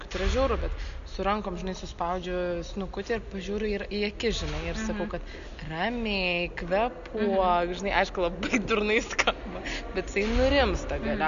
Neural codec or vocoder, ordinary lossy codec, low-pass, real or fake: none; AAC, 96 kbps; 7.2 kHz; real